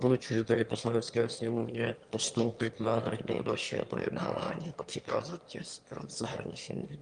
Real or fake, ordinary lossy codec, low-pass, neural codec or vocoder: fake; Opus, 32 kbps; 9.9 kHz; autoencoder, 22.05 kHz, a latent of 192 numbers a frame, VITS, trained on one speaker